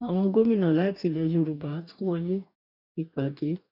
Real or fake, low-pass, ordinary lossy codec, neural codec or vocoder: fake; 5.4 kHz; none; codec, 44.1 kHz, 2.6 kbps, DAC